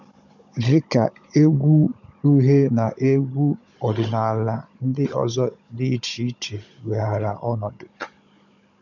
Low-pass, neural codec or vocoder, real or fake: 7.2 kHz; codec, 16 kHz, 16 kbps, FunCodec, trained on Chinese and English, 50 frames a second; fake